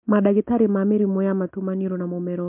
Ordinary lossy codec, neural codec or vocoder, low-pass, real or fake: MP3, 32 kbps; none; 3.6 kHz; real